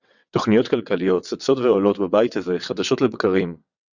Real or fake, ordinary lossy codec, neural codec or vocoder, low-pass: fake; Opus, 64 kbps; vocoder, 22.05 kHz, 80 mel bands, WaveNeXt; 7.2 kHz